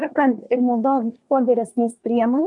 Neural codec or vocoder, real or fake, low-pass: codec, 24 kHz, 1 kbps, SNAC; fake; 10.8 kHz